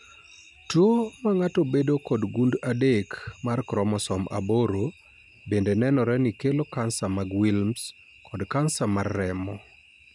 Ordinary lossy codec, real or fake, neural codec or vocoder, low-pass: none; real; none; 10.8 kHz